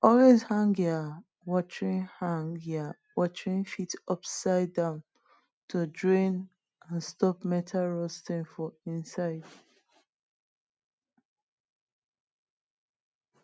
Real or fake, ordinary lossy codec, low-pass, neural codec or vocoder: real; none; none; none